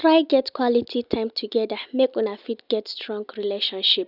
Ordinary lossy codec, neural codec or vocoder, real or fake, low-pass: none; none; real; 5.4 kHz